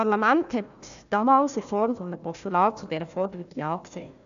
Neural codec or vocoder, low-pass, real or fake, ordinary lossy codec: codec, 16 kHz, 1 kbps, FunCodec, trained on Chinese and English, 50 frames a second; 7.2 kHz; fake; AAC, 96 kbps